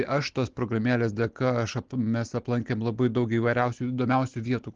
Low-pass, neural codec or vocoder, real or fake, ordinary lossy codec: 7.2 kHz; none; real; Opus, 16 kbps